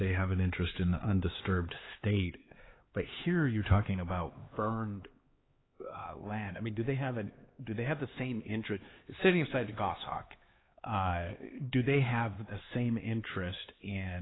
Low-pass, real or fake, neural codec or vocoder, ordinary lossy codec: 7.2 kHz; fake; codec, 16 kHz, 4 kbps, X-Codec, HuBERT features, trained on LibriSpeech; AAC, 16 kbps